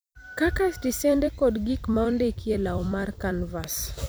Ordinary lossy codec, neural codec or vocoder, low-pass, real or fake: none; vocoder, 44.1 kHz, 128 mel bands every 256 samples, BigVGAN v2; none; fake